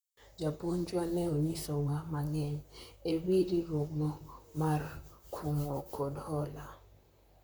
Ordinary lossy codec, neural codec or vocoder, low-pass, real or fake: none; vocoder, 44.1 kHz, 128 mel bands, Pupu-Vocoder; none; fake